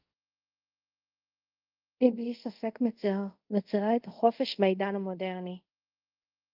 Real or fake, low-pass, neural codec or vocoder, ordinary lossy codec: fake; 5.4 kHz; codec, 24 kHz, 0.5 kbps, DualCodec; Opus, 24 kbps